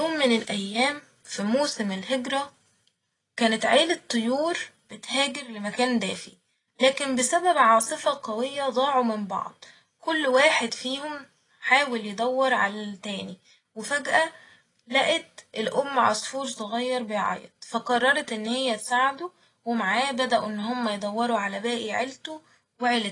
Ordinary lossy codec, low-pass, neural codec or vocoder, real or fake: AAC, 32 kbps; 10.8 kHz; none; real